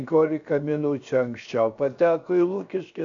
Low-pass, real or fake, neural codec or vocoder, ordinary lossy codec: 7.2 kHz; fake; codec, 16 kHz, 0.7 kbps, FocalCodec; AAC, 64 kbps